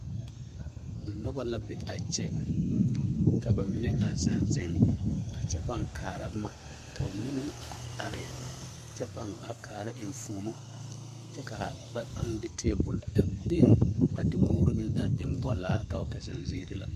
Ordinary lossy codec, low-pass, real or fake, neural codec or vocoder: MP3, 64 kbps; 14.4 kHz; fake; codec, 32 kHz, 1.9 kbps, SNAC